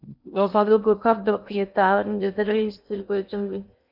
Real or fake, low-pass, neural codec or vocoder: fake; 5.4 kHz; codec, 16 kHz in and 24 kHz out, 0.6 kbps, FocalCodec, streaming, 2048 codes